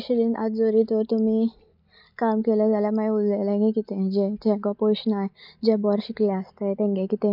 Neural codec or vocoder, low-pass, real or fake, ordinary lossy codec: none; 5.4 kHz; real; none